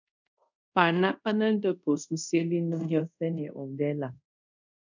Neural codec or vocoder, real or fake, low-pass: codec, 24 kHz, 0.5 kbps, DualCodec; fake; 7.2 kHz